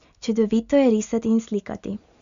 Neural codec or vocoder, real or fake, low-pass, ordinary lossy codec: none; real; 7.2 kHz; Opus, 64 kbps